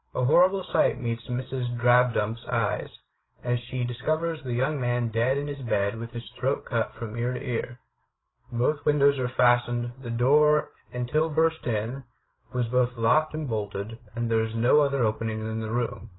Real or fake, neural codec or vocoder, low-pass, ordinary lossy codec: fake; codec, 16 kHz, 8 kbps, FreqCodec, larger model; 7.2 kHz; AAC, 16 kbps